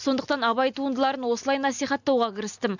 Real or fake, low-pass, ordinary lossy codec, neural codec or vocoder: real; 7.2 kHz; none; none